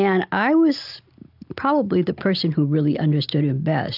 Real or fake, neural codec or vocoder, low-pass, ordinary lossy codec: real; none; 5.4 kHz; AAC, 48 kbps